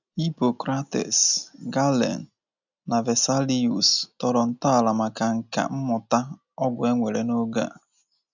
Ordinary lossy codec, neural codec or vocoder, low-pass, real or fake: none; none; 7.2 kHz; real